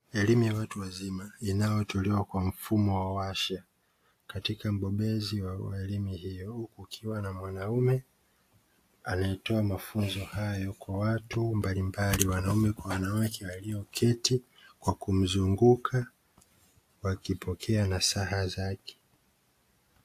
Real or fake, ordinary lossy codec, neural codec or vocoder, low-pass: real; AAC, 64 kbps; none; 14.4 kHz